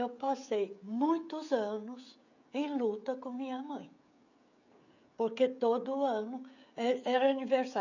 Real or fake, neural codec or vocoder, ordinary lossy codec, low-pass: fake; codec, 16 kHz, 16 kbps, FreqCodec, smaller model; none; 7.2 kHz